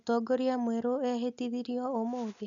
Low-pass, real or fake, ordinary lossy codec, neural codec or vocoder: 7.2 kHz; real; none; none